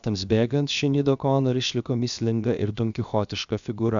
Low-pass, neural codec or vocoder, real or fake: 7.2 kHz; codec, 16 kHz, 0.7 kbps, FocalCodec; fake